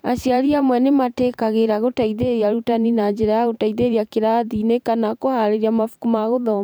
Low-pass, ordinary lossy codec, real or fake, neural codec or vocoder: none; none; fake; vocoder, 44.1 kHz, 128 mel bands every 512 samples, BigVGAN v2